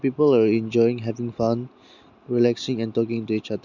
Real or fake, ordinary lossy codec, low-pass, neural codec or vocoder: real; none; 7.2 kHz; none